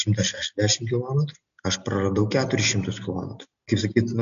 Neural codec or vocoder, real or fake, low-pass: none; real; 7.2 kHz